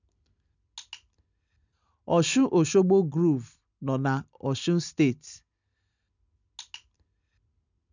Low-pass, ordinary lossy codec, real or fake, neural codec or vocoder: 7.2 kHz; none; real; none